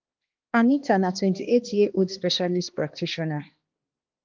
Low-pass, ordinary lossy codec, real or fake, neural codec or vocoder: 7.2 kHz; Opus, 32 kbps; fake; codec, 16 kHz, 2 kbps, X-Codec, HuBERT features, trained on balanced general audio